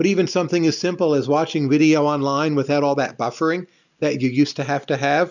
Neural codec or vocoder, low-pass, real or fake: none; 7.2 kHz; real